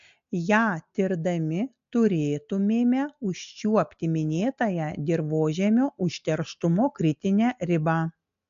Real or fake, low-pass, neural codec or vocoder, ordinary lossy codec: real; 7.2 kHz; none; AAC, 64 kbps